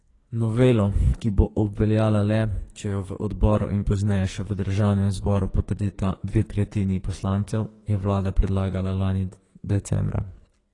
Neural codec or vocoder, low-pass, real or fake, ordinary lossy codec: codec, 44.1 kHz, 2.6 kbps, SNAC; 10.8 kHz; fake; AAC, 32 kbps